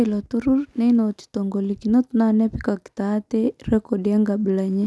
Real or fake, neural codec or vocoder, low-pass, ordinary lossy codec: real; none; none; none